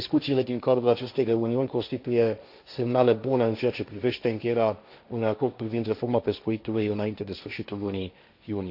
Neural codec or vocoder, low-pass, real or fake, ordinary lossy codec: codec, 16 kHz, 1.1 kbps, Voila-Tokenizer; 5.4 kHz; fake; none